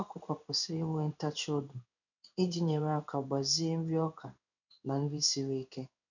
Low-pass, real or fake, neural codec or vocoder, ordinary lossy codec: 7.2 kHz; fake; codec, 16 kHz in and 24 kHz out, 1 kbps, XY-Tokenizer; none